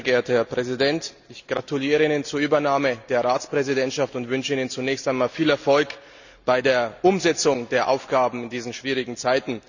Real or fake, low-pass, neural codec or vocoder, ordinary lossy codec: real; 7.2 kHz; none; none